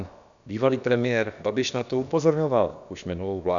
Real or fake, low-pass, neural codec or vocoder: fake; 7.2 kHz; codec, 16 kHz, about 1 kbps, DyCAST, with the encoder's durations